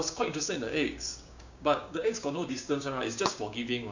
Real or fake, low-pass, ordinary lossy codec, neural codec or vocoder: fake; 7.2 kHz; none; codec, 16 kHz, 6 kbps, DAC